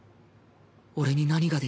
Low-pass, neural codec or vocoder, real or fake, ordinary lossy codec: none; none; real; none